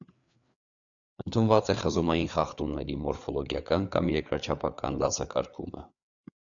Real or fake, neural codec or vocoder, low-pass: fake; codec, 16 kHz, 4 kbps, FreqCodec, larger model; 7.2 kHz